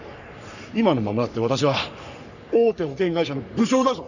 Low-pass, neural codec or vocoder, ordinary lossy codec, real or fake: 7.2 kHz; codec, 44.1 kHz, 3.4 kbps, Pupu-Codec; none; fake